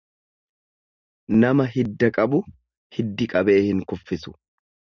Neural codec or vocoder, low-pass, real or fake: none; 7.2 kHz; real